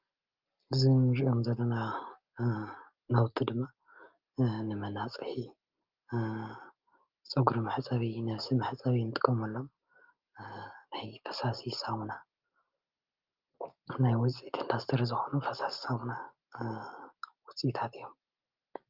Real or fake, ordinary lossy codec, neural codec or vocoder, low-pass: real; Opus, 32 kbps; none; 5.4 kHz